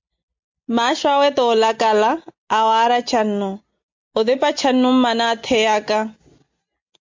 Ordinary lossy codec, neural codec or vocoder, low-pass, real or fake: MP3, 48 kbps; none; 7.2 kHz; real